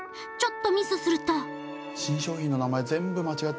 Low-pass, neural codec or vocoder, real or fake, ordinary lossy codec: none; none; real; none